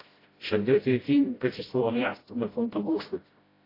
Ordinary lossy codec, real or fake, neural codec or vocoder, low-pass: AAC, 24 kbps; fake; codec, 16 kHz, 0.5 kbps, FreqCodec, smaller model; 5.4 kHz